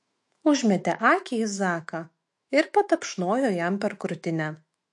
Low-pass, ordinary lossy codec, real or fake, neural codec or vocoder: 10.8 kHz; MP3, 48 kbps; fake; autoencoder, 48 kHz, 128 numbers a frame, DAC-VAE, trained on Japanese speech